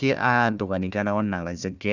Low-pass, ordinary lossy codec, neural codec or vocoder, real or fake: 7.2 kHz; none; codec, 16 kHz, 1 kbps, FunCodec, trained on Chinese and English, 50 frames a second; fake